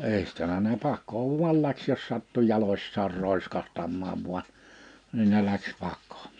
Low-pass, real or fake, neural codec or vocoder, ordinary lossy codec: 9.9 kHz; real; none; none